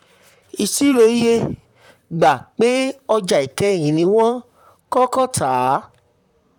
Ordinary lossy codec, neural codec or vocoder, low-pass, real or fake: none; vocoder, 44.1 kHz, 128 mel bands, Pupu-Vocoder; 19.8 kHz; fake